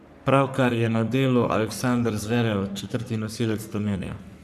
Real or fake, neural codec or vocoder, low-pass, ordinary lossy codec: fake; codec, 44.1 kHz, 3.4 kbps, Pupu-Codec; 14.4 kHz; none